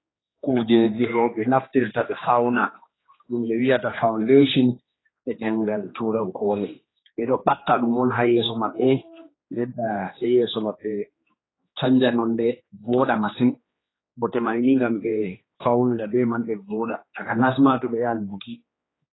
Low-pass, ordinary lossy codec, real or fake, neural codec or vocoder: 7.2 kHz; AAC, 16 kbps; fake; codec, 16 kHz, 2 kbps, X-Codec, HuBERT features, trained on general audio